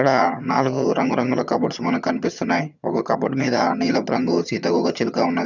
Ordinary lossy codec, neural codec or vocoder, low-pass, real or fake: none; vocoder, 22.05 kHz, 80 mel bands, HiFi-GAN; 7.2 kHz; fake